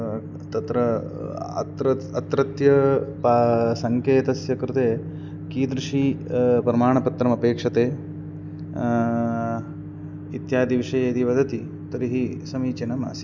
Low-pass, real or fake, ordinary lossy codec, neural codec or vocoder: 7.2 kHz; real; none; none